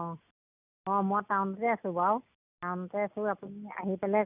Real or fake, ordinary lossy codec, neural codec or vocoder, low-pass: real; none; none; 3.6 kHz